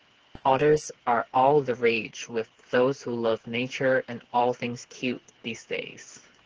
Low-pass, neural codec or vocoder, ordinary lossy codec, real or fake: 7.2 kHz; codec, 16 kHz, 4 kbps, FreqCodec, smaller model; Opus, 16 kbps; fake